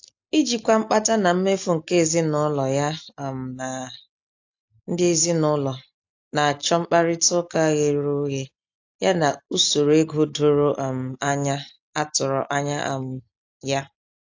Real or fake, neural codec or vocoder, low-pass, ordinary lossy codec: real; none; 7.2 kHz; AAC, 48 kbps